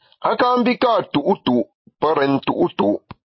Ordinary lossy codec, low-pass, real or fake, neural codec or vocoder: MP3, 24 kbps; 7.2 kHz; real; none